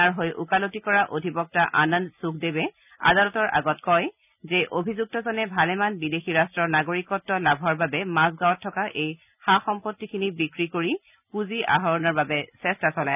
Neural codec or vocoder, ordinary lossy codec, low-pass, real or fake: none; none; 3.6 kHz; real